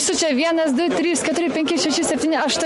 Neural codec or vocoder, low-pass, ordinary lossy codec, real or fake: none; 14.4 kHz; MP3, 48 kbps; real